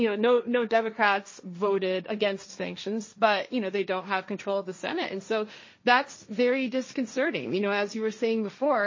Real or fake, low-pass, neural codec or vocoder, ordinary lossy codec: fake; 7.2 kHz; codec, 16 kHz, 1.1 kbps, Voila-Tokenizer; MP3, 32 kbps